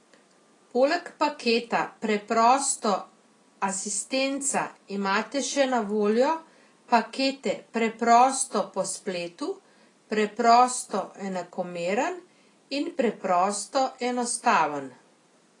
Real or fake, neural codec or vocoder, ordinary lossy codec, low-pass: real; none; AAC, 32 kbps; 10.8 kHz